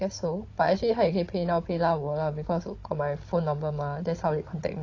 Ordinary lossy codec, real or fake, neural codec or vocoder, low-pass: AAC, 32 kbps; fake; codec, 16 kHz, 16 kbps, FunCodec, trained on Chinese and English, 50 frames a second; 7.2 kHz